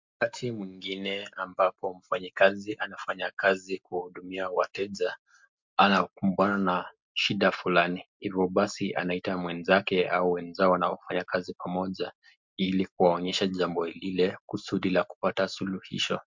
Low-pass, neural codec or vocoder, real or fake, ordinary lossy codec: 7.2 kHz; none; real; MP3, 64 kbps